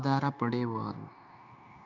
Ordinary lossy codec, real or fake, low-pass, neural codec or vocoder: none; fake; 7.2 kHz; codec, 16 kHz, 0.9 kbps, LongCat-Audio-Codec